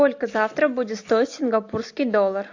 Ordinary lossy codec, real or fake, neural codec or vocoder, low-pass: AAC, 48 kbps; real; none; 7.2 kHz